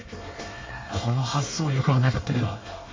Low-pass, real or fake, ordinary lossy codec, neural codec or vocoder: 7.2 kHz; fake; MP3, 32 kbps; codec, 24 kHz, 1 kbps, SNAC